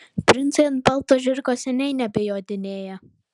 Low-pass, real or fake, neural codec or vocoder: 10.8 kHz; real; none